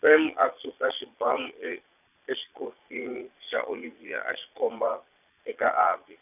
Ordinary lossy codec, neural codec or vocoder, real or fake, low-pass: none; vocoder, 22.05 kHz, 80 mel bands, Vocos; fake; 3.6 kHz